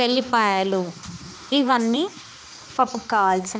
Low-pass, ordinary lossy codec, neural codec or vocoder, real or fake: none; none; codec, 16 kHz, 2 kbps, X-Codec, HuBERT features, trained on balanced general audio; fake